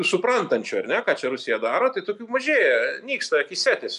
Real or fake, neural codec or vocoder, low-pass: real; none; 10.8 kHz